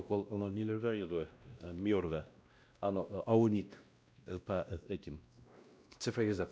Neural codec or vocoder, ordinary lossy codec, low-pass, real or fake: codec, 16 kHz, 1 kbps, X-Codec, WavLM features, trained on Multilingual LibriSpeech; none; none; fake